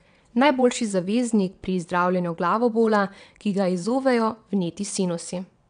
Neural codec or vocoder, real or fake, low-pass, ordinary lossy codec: vocoder, 22.05 kHz, 80 mel bands, WaveNeXt; fake; 9.9 kHz; none